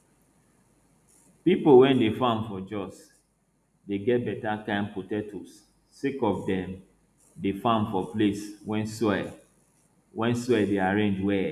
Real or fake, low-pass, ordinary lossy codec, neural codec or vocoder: real; 14.4 kHz; none; none